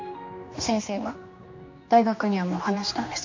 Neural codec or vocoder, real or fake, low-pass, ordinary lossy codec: codec, 16 kHz, 2 kbps, X-Codec, HuBERT features, trained on general audio; fake; 7.2 kHz; MP3, 48 kbps